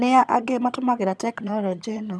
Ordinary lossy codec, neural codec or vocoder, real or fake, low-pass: none; vocoder, 22.05 kHz, 80 mel bands, HiFi-GAN; fake; none